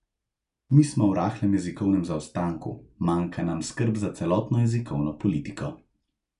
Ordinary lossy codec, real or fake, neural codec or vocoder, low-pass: none; real; none; 10.8 kHz